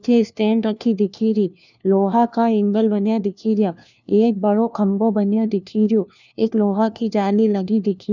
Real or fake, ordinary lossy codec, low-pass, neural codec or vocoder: fake; none; 7.2 kHz; codec, 16 kHz, 1 kbps, FunCodec, trained on LibriTTS, 50 frames a second